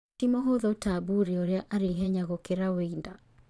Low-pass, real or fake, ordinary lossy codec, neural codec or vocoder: none; fake; none; vocoder, 22.05 kHz, 80 mel bands, Vocos